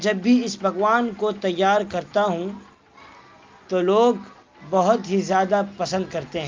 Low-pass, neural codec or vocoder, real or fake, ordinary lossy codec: 7.2 kHz; none; real; Opus, 32 kbps